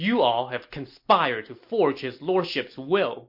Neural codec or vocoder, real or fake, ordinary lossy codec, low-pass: none; real; MP3, 32 kbps; 5.4 kHz